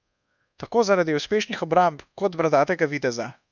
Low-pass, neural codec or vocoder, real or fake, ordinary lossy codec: 7.2 kHz; codec, 24 kHz, 1.2 kbps, DualCodec; fake; none